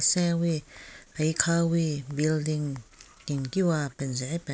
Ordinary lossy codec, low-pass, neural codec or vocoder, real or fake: none; none; none; real